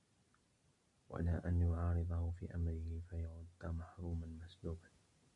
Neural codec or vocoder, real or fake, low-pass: none; real; 10.8 kHz